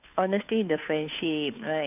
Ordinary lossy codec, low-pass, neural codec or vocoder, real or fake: none; 3.6 kHz; codec, 16 kHz, 2 kbps, FunCodec, trained on Chinese and English, 25 frames a second; fake